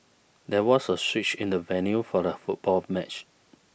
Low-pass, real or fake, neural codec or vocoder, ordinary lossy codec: none; real; none; none